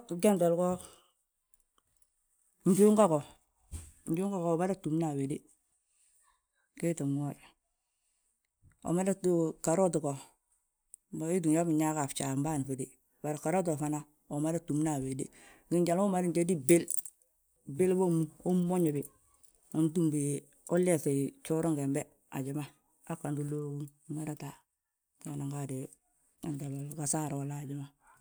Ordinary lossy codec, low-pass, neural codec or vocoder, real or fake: none; none; none; real